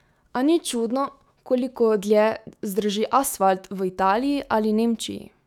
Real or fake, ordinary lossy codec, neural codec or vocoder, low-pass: fake; none; autoencoder, 48 kHz, 128 numbers a frame, DAC-VAE, trained on Japanese speech; 19.8 kHz